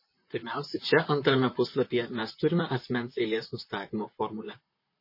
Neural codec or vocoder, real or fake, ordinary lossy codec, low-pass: vocoder, 44.1 kHz, 128 mel bands, Pupu-Vocoder; fake; MP3, 24 kbps; 5.4 kHz